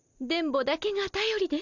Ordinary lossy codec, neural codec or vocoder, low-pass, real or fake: none; none; 7.2 kHz; real